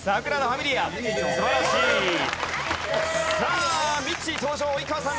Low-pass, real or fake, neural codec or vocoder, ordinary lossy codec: none; real; none; none